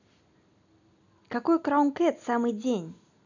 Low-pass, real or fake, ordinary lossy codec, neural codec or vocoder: 7.2 kHz; real; none; none